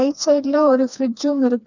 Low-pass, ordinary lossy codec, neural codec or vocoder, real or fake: 7.2 kHz; none; codec, 16 kHz, 2 kbps, FreqCodec, smaller model; fake